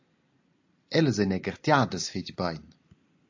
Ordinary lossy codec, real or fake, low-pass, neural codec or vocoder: AAC, 48 kbps; real; 7.2 kHz; none